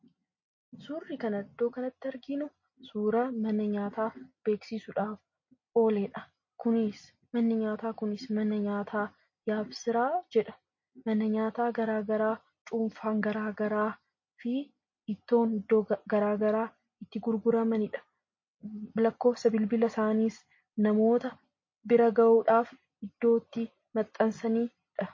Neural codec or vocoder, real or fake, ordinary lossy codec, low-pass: none; real; MP3, 32 kbps; 7.2 kHz